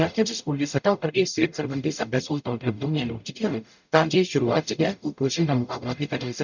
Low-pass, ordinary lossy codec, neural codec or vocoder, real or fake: 7.2 kHz; none; codec, 44.1 kHz, 0.9 kbps, DAC; fake